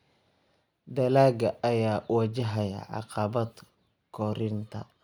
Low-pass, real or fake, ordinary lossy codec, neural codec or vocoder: 19.8 kHz; real; none; none